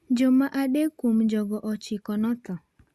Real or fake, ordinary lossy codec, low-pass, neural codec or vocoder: real; Opus, 64 kbps; 14.4 kHz; none